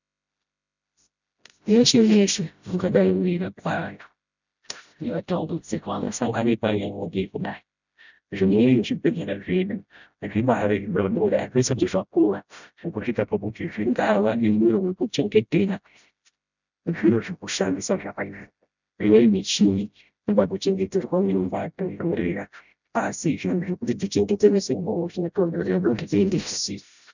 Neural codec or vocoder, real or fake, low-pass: codec, 16 kHz, 0.5 kbps, FreqCodec, smaller model; fake; 7.2 kHz